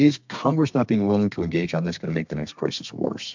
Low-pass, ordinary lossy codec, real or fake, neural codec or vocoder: 7.2 kHz; MP3, 64 kbps; fake; codec, 32 kHz, 1.9 kbps, SNAC